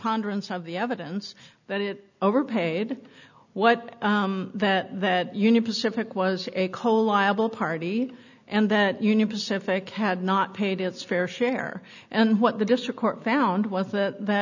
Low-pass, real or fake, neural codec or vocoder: 7.2 kHz; real; none